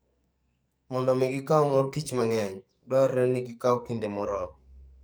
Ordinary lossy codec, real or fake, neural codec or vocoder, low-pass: none; fake; codec, 44.1 kHz, 2.6 kbps, SNAC; none